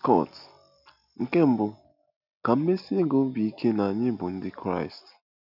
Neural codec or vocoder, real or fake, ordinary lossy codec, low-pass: none; real; none; 5.4 kHz